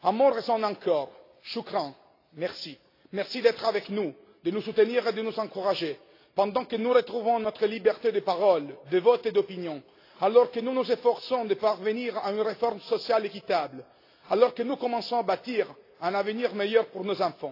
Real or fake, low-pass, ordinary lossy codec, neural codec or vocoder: real; 5.4 kHz; AAC, 32 kbps; none